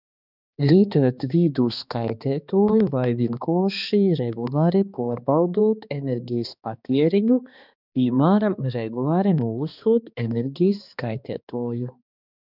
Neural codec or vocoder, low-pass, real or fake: codec, 16 kHz, 2 kbps, X-Codec, HuBERT features, trained on balanced general audio; 5.4 kHz; fake